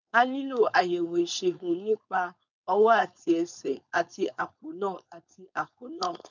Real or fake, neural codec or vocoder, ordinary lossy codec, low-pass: fake; codec, 16 kHz, 4.8 kbps, FACodec; none; 7.2 kHz